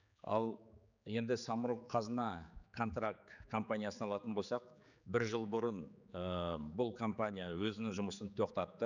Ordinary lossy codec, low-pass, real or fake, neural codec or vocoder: none; 7.2 kHz; fake; codec, 16 kHz, 4 kbps, X-Codec, HuBERT features, trained on general audio